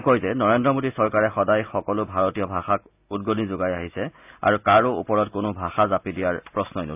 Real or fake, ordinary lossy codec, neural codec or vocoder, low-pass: real; AAC, 32 kbps; none; 3.6 kHz